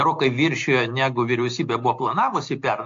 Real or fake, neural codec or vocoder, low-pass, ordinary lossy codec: real; none; 7.2 kHz; AAC, 48 kbps